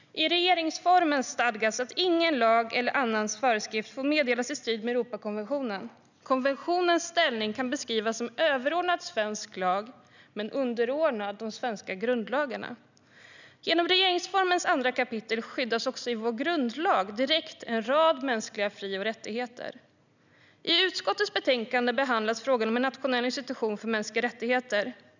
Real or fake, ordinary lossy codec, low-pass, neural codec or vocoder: real; none; 7.2 kHz; none